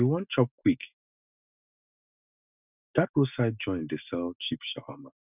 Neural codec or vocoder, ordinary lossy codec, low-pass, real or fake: none; none; 3.6 kHz; real